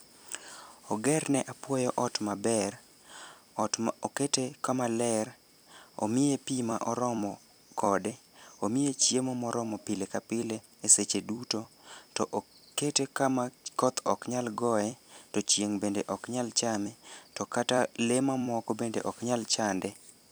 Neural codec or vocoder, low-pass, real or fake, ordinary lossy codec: vocoder, 44.1 kHz, 128 mel bands every 256 samples, BigVGAN v2; none; fake; none